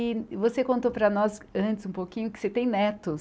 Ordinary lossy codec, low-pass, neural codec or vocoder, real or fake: none; none; none; real